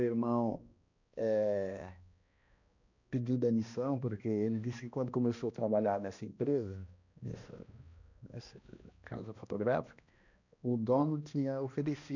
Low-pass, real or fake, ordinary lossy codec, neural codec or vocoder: 7.2 kHz; fake; none; codec, 16 kHz, 1 kbps, X-Codec, HuBERT features, trained on balanced general audio